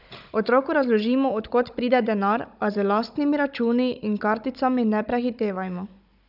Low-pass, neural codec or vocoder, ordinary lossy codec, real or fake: 5.4 kHz; codec, 16 kHz, 16 kbps, FunCodec, trained on Chinese and English, 50 frames a second; none; fake